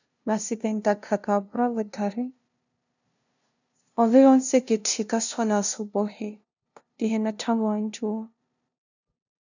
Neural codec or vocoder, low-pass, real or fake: codec, 16 kHz, 0.5 kbps, FunCodec, trained on LibriTTS, 25 frames a second; 7.2 kHz; fake